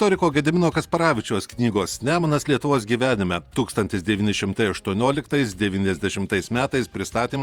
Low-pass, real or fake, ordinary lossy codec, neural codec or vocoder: 19.8 kHz; fake; Opus, 64 kbps; vocoder, 48 kHz, 128 mel bands, Vocos